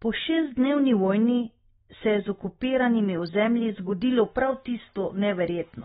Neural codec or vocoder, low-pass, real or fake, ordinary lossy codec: none; 7.2 kHz; real; AAC, 16 kbps